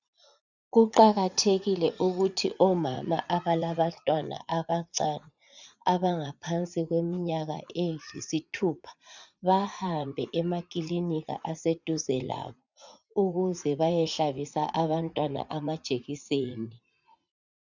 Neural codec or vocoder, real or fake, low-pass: vocoder, 44.1 kHz, 80 mel bands, Vocos; fake; 7.2 kHz